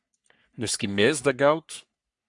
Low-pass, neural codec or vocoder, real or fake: 10.8 kHz; codec, 44.1 kHz, 3.4 kbps, Pupu-Codec; fake